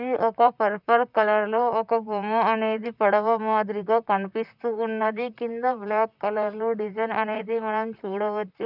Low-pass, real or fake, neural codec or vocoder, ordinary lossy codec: 5.4 kHz; fake; vocoder, 44.1 kHz, 128 mel bands, Pupu-Vocoder; none